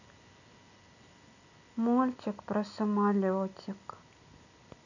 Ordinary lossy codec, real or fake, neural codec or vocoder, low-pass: none; real; none; 7.2 kHz